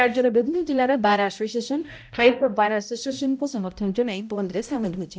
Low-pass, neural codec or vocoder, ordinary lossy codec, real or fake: none; codec, 16 kHz, 0.5 kbps, X-Codec, HuBERT features, trained on balanced general audio; none; fake